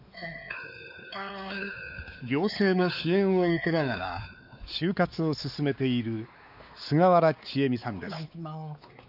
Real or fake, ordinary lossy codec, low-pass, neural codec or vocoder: fake; none; 5.4 kHz; codec, 16 kHz, 4 kbps, X-Codec, WavLM features, trained on Multilingual LibriSpeech